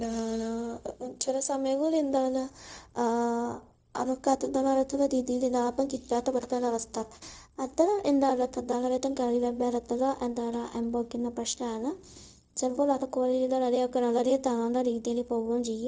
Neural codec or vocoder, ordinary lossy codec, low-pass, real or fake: codec, 16 kHz, 0.4 kbps, LongCat-Audio-Codec; none; none; fake